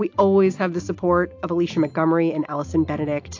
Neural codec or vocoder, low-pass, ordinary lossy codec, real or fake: none; 7.2 kHz; AAC, 48 kbps; real